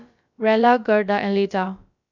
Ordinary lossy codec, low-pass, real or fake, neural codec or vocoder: none; 7.2 kHz; fake; codec, 16 kHz, about 1 kbps, DyCAST, with the encoder's durations